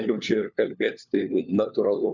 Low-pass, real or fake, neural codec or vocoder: 7.2 kHz; fake; codec, 16 kHz, 4 kbps, FunCodec, trained on LibriTTS, 50 frames a second